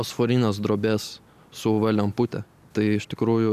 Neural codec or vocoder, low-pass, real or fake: none; 14.4 kHz; real